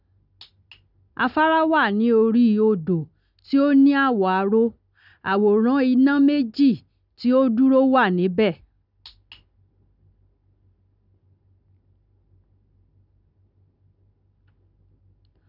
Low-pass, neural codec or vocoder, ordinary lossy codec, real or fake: 5.4 kHz; none; none; real